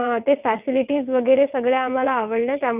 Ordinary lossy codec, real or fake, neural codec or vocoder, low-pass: none; fake; vocoder, 22.05 kHz, 80 mel bands, WaveNeXt; 3.6 kHz